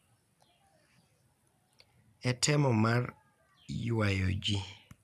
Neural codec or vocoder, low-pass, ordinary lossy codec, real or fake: none; 14.4 kHz; none; real